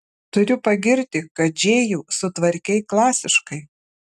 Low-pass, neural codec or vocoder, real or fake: 14.4 kHz; none; real